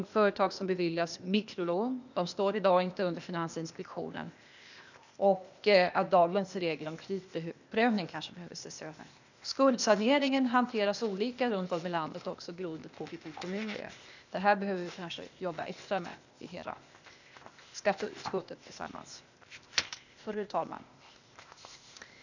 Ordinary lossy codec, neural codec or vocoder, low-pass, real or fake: none; codec, 16 kHz, 0.8 kbps, ZipCodec; 7.2 kHz; fake